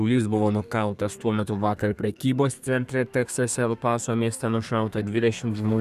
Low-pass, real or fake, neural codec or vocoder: 14.4 kHz; fake; codec, 32 kHz, 1.9 kbps, SNAC